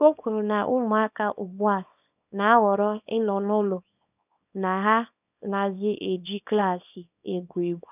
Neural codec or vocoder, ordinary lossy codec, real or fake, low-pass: codec, 24 kHz, 0.9 kbps, WavTokenizer, small release; none; fake; 3.6 kHz